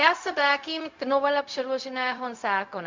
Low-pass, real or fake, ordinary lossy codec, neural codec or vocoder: 7.2 kHz; fake; MP3, 64 kbps; codec, 16 kHz, 0.4 kbps, LongCat-Audio-Codec